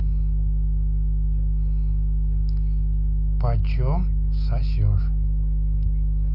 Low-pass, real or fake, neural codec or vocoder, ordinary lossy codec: 5.4 kHz; real; none; none